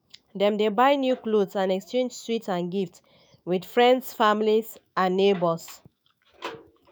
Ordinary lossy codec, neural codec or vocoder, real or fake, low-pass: none; autoencoder, 48 kHz, 128 numbers a frame, DAC-VAE, trained on Japanese speech; fake; none